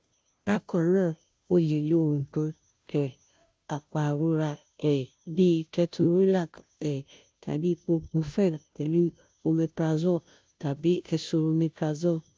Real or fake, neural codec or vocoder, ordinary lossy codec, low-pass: fake; codec, 16 kHz, 0.5 kbps, FunCodec, trained on Chinese and English, 25 frames a second; none; none